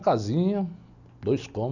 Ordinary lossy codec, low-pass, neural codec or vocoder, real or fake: none; 7.2 kHz; none; real